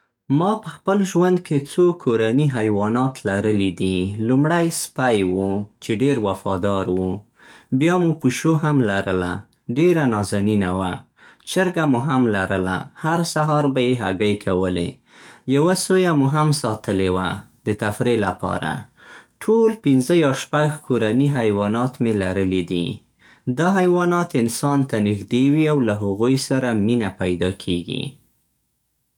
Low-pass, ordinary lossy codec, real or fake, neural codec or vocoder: 19.8 kHz; none; fake; codec, 44.1 kHz, 7.8 kbps, DAC